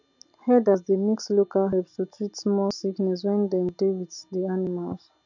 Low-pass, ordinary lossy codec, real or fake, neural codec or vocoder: 7.2 kHz; none; real; none